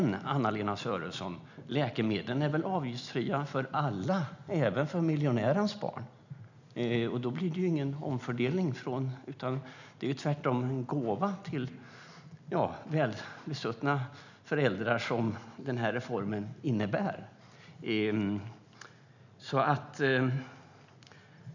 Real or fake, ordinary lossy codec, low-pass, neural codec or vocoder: real; none; 7.2 kHz; none